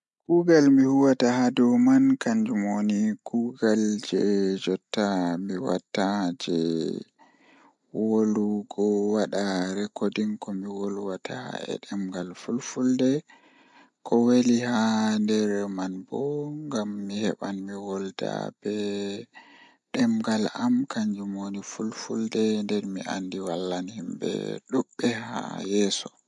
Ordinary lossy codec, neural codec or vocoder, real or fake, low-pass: MP3, 64 kbps; none; real; 10.8 kHz